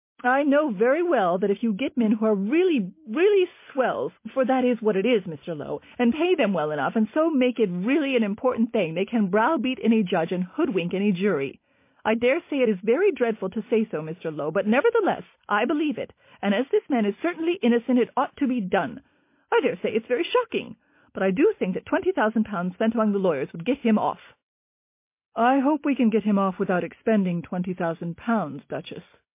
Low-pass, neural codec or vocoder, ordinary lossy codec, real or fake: 3.6 kHz; none; MP3, 24 kbps; real